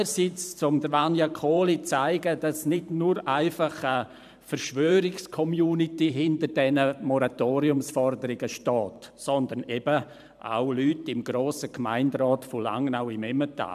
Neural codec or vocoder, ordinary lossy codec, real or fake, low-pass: vocoder, 44.1 kHz, 128 mel bands every 512 samples, BigVGAN v2; none; fake; 14.4 kHz